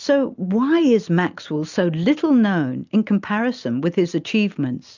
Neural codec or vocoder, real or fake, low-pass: none; real; 7.2 kHz